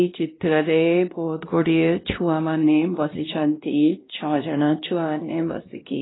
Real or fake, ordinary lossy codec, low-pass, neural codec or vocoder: fake; AAC, 16 kbps; 7.2 kHz; codec, 16 kHz, 1 kbps, X-Codec, WavLM features, trained on Multilingual LibriSpeech